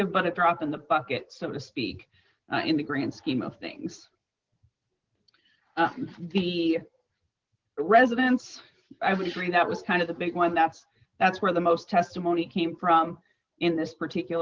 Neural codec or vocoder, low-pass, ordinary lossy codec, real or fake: none; 7.2 kHz; Opus, 16 kbps; real